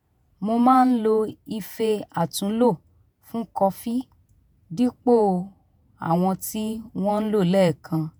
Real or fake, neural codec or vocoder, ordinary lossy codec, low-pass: fake; vocoder, 48 kHz, 128 mel bands, Vocos; none; none